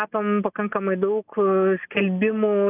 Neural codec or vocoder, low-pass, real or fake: none; 3.6 kHz; real